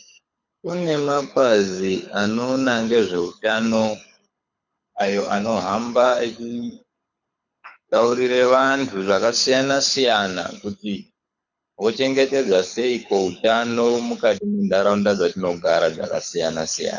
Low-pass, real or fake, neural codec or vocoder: 7.2 kHz; fake; codec, 24 kHz, 6 kbps, HILCodec